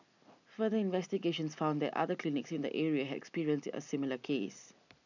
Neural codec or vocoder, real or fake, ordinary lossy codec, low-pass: none; real; none; 7.2 kHz